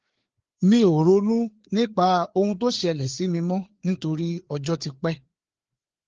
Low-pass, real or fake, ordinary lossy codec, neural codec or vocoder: 7.2 kHz; fake; Opus, 16 kbps; codec, 16 kHz, 4 kbps, FreqCodec, larger model